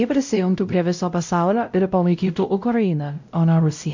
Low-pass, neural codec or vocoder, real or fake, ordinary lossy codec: 7.2 kHz; codec, 16 kHz, 0.5 kbps, X-Codec, WavLM features, trained on Multilingual LibriSpeech; fake; MP3, 64 kbps